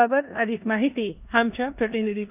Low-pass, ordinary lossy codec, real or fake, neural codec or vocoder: 3.6 kHz; none; fake; codec, 16 kHz in and 24 kHz out, 0.9 kbps, LongCat-Audio-Codec, four codebook decoder